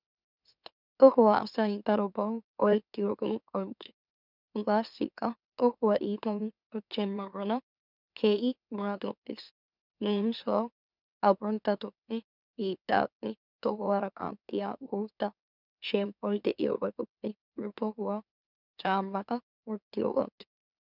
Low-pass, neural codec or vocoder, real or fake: 5.4 kHz; autoencoder, 44.1 kHz, a latent of 192 numbers a frame, MeloTTS; fake